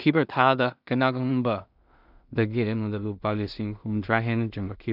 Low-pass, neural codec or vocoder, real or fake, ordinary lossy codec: 5.4 kHz; codec, 16 kHz in and 24 kHz out, 0.4 kbps, LongCat-Audio-Codec, two codebook decoder; fake; none